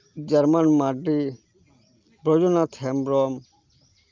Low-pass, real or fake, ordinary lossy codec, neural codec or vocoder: 7.2 kHz; real; Opus, 24 kbps; none